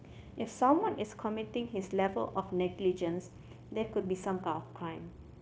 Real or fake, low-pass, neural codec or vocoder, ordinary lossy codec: fake; none; codec, 16 kHz, 0.9 kbps, LongCat-Audio-Codec; none